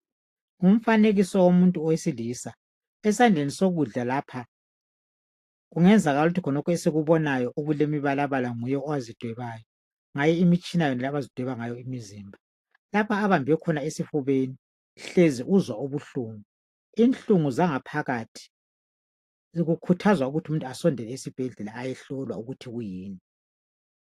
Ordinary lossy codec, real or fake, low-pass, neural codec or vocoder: AAC, 64 kbps; real; 14.4 kHz; none